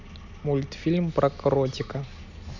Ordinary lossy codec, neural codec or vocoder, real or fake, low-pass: none; none; real; 7.2 kHz